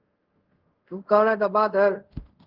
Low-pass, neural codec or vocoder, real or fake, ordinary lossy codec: 5.4 kHz; codec, 24 kHz, 0.5 kbps, DualCodec; fake; Opus, 16 kbps